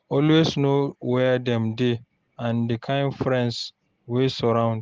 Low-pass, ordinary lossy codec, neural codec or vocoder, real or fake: 7.2 kHz; Opus, 16 kbps; none; real